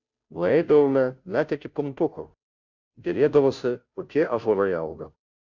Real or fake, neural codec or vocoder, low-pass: fake; codec, 16 kHz, 0.5 kbps, FunCodec, trained on Chinese and English, 25 frames a second; 7.2 kHz